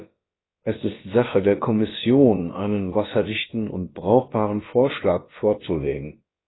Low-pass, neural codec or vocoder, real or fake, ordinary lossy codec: 7.2 kHz; codec, 16 kHz, about 1 kbps, DyCAST, with the encoder's durations; fake; AAC, 16 kbps